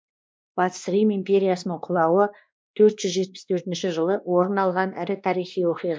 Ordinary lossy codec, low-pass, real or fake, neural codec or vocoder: none; none; fake; codec, 16 kHz, 2 kbps, X-Codec, WavLM features, trained on Multilingual LibriSpeech